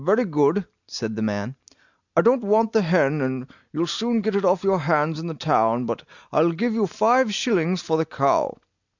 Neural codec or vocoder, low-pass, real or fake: none; 7.2 kHz; real